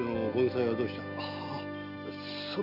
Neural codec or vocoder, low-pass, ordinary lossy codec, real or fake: none; 5.4 kHz; none; real